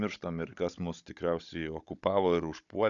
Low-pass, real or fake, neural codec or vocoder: 7.2 kHz; fake; codec, 16 kHz, 16 kbps, FunCodec, trained on Chinese and English, 50 frames a second